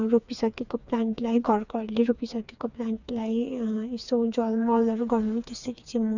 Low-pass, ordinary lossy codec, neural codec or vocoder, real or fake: 7.2 kHz; none; codec, 16 kHz, 4 kbps, FreqCodec, smaller model; fake